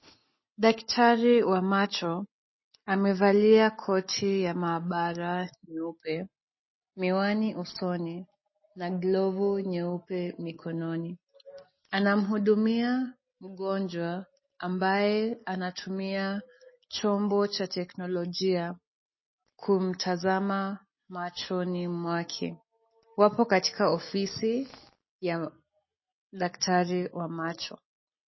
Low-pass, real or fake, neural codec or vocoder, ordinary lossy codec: 7.2 kHz; real; none; MP3, 24 kbps